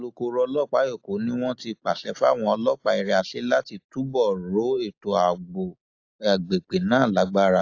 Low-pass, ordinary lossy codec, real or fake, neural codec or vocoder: 7.2 kHz; AAC, 48 kbps; real; none